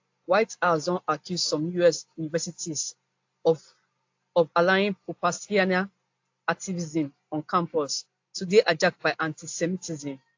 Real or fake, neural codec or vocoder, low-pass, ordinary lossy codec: real; none; 7.2 kHz; AAC, 48 kbps